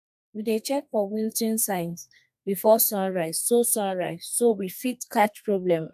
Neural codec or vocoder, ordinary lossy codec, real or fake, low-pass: codec, 32 kHz, 1.9 kbps, SNAC; AAC, 96 kbps; fake; 14.4 kHz